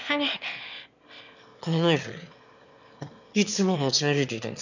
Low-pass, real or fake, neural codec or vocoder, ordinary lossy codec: 7.2 kHz; fake; autoencoder, 22.05 kHz, a latent of 192 numbers a frame, VITS, trained on one speaker; none